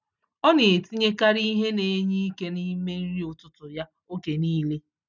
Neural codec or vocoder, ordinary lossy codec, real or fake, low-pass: none; none; real; 7.2 kHz